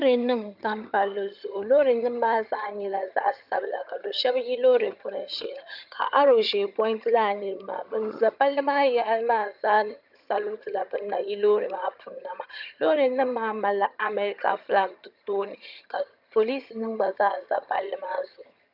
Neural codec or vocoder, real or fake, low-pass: vocoder, 22.05 kHz, 80 mel bands, HiFi-GAN; fake; 5.4 kHz